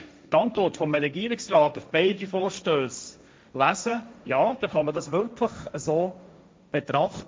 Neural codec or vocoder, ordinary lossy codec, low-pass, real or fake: codec, 16 kHz, 1.1 kbps, Voila-Tokenizer; none; none; fake